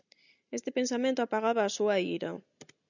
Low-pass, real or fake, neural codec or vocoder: 7.2 kHz; real; none